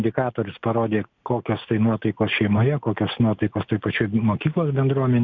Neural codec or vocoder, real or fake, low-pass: none; real; 7.2 kHz